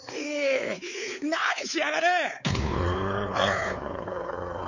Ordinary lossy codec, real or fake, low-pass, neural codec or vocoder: none; fake; 7.2 kHz; codec, 16 kHz, 4 kbps, X-Codec, WavLM features, trained on Multilingual LibriSpeech